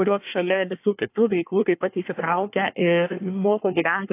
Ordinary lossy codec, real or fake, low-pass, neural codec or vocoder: AAC, 24 kbps; fake; 3.6 kHz; codec, 24 kHz, 1 kbps, SNAC